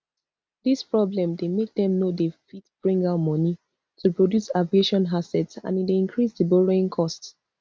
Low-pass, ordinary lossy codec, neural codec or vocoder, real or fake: none; none; none; real